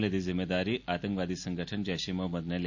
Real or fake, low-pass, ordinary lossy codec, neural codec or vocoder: real; 7.2 kHz; MP3, 64 kbps; none